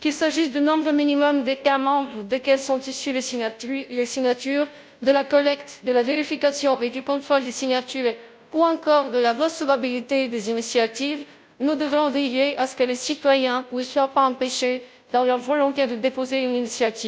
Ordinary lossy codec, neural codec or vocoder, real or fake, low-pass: none; codec, 16 kHz, 0.5 kbps, FunCodec, trained on Chinese and English, 25 frames a second; fake; none